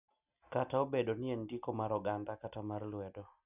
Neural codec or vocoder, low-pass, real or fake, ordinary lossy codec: none; 3.6 kHz; real; none